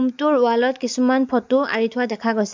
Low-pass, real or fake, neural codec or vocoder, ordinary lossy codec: 7.2 kHz; real; none; MP3, 64 kbps